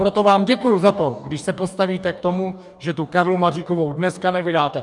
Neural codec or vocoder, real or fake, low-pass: codec, 44.1 kHz, 2.6 kbps, DAC; fake; 10.8 kHz